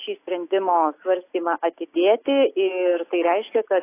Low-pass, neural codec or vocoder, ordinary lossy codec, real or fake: 3.6 kHz; none; AAC, 24 kbps; real